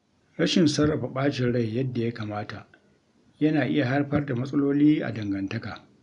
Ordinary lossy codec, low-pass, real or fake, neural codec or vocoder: none; 10.8 kHz; real; none